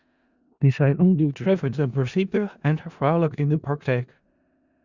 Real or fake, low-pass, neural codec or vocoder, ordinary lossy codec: fake; 7.2 kHz; codec, 16 kHz in and 24 kHz out, 0.4 kbps, LongCat-Audio-Codec, four codebook decoder; Opus, 64 kbps